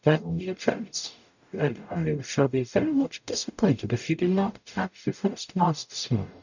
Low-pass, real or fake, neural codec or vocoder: 7.2 kHz; fake; codec, 44.1 kHz, 0.9 kbps, DAC